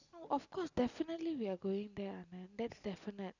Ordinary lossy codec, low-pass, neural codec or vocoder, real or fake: none; 7.2 kHz; none; real